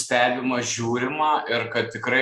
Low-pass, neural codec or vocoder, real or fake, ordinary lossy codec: 14.4 kHz; none; real; Opus, 64 kbps